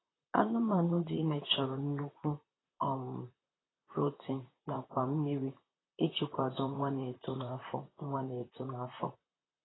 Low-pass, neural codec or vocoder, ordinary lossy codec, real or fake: 7.2 kHz; vocoder, 44.1 kHz, 128 mel bands, Pupu-Vocoder; AAC, 16 kbps; fake